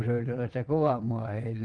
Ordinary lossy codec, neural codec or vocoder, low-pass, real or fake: Opus, 24 kbps; vocoder, 22.05 kHz, 80 mel bands, WaveNeXt; 9.9 kHz; fake